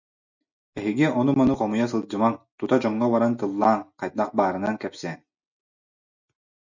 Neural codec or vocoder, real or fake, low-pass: none; real; 7.2 kHz